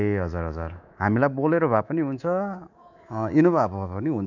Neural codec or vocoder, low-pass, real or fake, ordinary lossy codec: codec, 24 kHz, 3.1 kbps, DualCodec; 7.2 kHz; fake; none